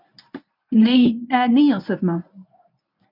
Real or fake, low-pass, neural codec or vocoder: fake; 5.4 kHz; codec, 24 kHz, 0.9 kbps, WavTokenizer, medium speech release version 1